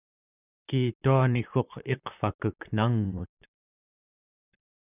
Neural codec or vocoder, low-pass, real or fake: vocoder, 44.1 kHz, 128 mel bands, Pupu-Vocoder; 3.6 kHz; fake